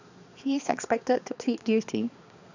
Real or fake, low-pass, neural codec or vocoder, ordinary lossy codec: fake; 7.2 kHz; codec, 16 kHz, 2 kbps, X-Codec, HuBERT features, trained on balanced general audio; none